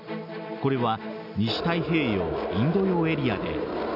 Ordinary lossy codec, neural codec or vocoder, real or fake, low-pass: none; none; real; 5.4 kHz